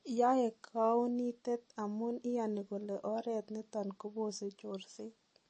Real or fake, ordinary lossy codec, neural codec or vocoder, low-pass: real; MP3, 32 kbps; none; 9.9 kHz